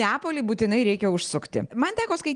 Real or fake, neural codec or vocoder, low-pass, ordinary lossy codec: real; none; 9.9 kHz; Opus, 24 kbps